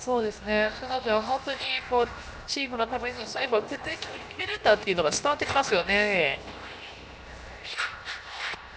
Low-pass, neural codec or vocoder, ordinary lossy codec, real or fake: none; codec, 16 kHz, 0.7 kbps, FocalCodec; none; fake